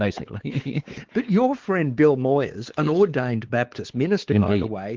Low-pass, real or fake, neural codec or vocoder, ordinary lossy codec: 7.2 kHz; fake; codec, 16 kHz, 4 kbps, X-Codec, WavLM features, trained on Multilingual LibriSpeech; Opus, 16 kbps